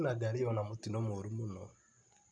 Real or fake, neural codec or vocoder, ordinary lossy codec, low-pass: real; none; none; 9.9 kHz